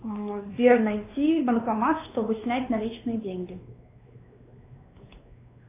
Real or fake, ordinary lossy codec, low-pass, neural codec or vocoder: fake; AAC, 24 kbps; 3.6 kHz; codec, 16 kHz, 2 kbps, X-Codec, WavLM features, trained on Multilingual LibriSpeech